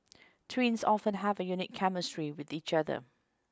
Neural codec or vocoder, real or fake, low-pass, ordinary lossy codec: none; real; none; none